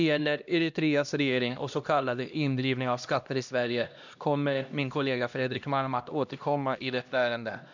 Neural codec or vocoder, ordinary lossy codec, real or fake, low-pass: codec, 16 kHz, 1 kbps, X-Codec, HuBERT features, trained on LibriSpeech; none; fake; 7.2 kHz